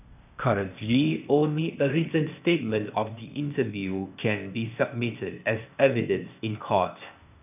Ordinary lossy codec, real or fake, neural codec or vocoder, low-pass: none; fake; codec, 16 kHz, 0.8 kbps, ZipCodec; 3.6 kHz